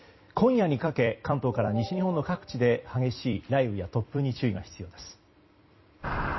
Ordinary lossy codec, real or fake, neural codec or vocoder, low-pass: MP3, 24 kbps; fake; vocoder, 44.1 kHz, 128 mel bands every 256 samples, BigVGAN v2; 7.2 kHz